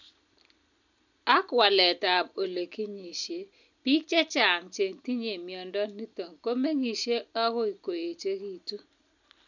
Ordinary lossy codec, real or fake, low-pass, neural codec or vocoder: none; real; 7.2 kHz; none